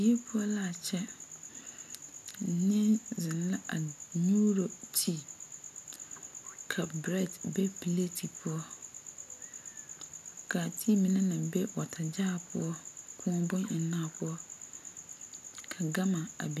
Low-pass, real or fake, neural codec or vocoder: 14.4 kHz; real; none